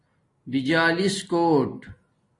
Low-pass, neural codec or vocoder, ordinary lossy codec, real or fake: 10.8 kHz; none; AAC, 32 kbps; real